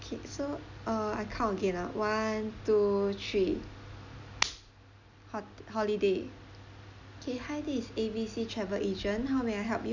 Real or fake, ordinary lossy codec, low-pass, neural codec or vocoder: real; none; 7.2 kHz; none